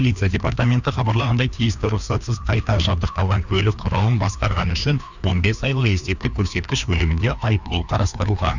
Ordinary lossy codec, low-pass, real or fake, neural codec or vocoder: none; 7.2 kHz; fake; codec, 16 kHz, 2 kbps, FreqCodec, larger model